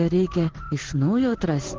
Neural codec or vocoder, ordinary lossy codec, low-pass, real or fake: codec, 16 kHz in and 24 kHz out, 1 kbps, XY-Tokenizer; Opus, 16 kbps; 7.2 kHz; fake